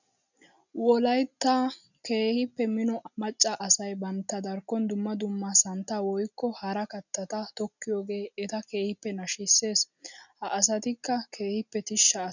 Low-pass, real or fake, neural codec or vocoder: 7.2 kHz; real; none